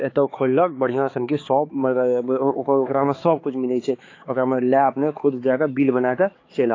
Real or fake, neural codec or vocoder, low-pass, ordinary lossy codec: fake; codec, 16 kHz, 4 kbps, X-Codec, HuBERT features, trained on balanced general audio; 7.2 kHz; AAC, 32 kbps